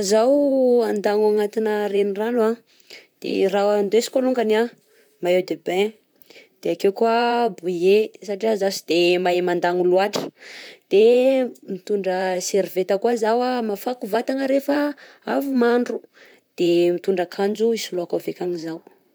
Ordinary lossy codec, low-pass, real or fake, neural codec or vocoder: none; none; fake; vocoder, 44.1 kHz, 128 mel bands, Pupu-Vocoder